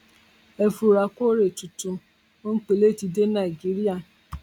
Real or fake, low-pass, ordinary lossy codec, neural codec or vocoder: real; 19.8 kHz; none; none